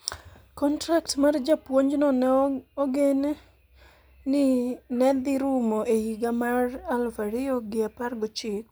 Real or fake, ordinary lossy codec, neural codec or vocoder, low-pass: real; none; none; none